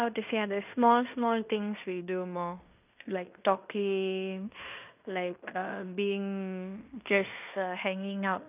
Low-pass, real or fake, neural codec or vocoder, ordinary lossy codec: 3.6 kHz; fake; codec, 16 kHz in and 24 kHz out, 0.9 kbps, LongCat-Audio-Codec, fine tuned four codebook decoder; none